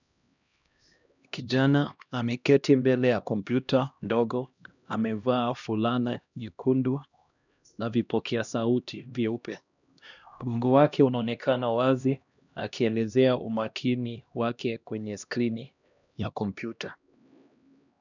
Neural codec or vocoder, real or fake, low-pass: codec, 16 kHz, 1 kbps, X-Codec, HuBERT features, trained on LibriSpeech; fake; 7.2 kHz